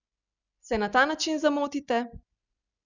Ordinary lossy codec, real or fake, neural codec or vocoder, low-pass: none; real; none; 7.2 kHz